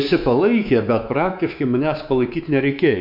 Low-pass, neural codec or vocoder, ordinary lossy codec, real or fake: 5.4 kHz; codec, 24 kHz, 3.1 kbps, DualCodec; MP3, 48 kbps; fake